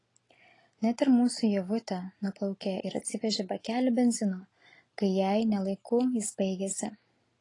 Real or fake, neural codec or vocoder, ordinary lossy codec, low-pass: real; none; AAC, 32 kbps; 10.8 kHz